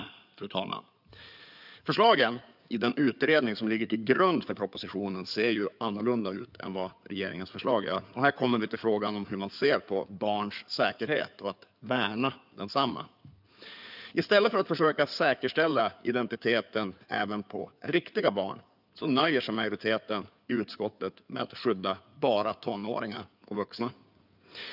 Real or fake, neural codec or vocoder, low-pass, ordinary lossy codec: fake; codec, 16 kHz in and 24 kHz out, 2.2 kbps, FireRedTTS-2 codec; 5.4 kHz; none